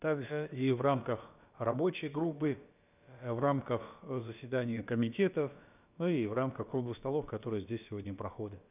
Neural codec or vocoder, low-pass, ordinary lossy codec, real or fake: codec, 16 kHz, about 1 kbps, DyCAST, with the encoder's durations; 3.6 kHz; none; fake